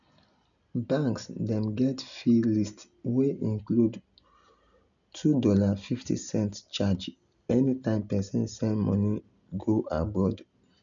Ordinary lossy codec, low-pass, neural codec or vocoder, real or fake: none; 7.2 kHz; none; real